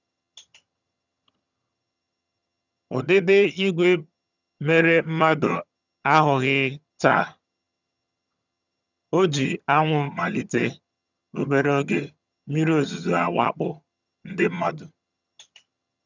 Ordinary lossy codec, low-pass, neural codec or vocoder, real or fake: none; 7.2 kHz; vocoder, 22.05 kHz, 80 mel bands, HiFi-GAN; fake